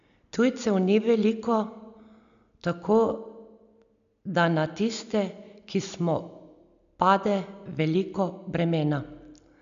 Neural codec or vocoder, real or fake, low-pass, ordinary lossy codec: none; real; 7.2 kHz; none